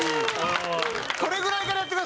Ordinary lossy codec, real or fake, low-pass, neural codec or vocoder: none; real; none; none